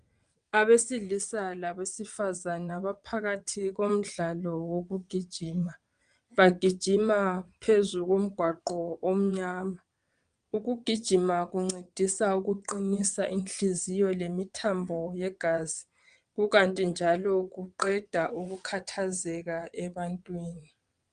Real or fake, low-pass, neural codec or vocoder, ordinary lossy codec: fake; 9.9 kHz; vocoder, 22.05 kHz, 80 mel bands, WaveNeXt; Opus, 32 kbps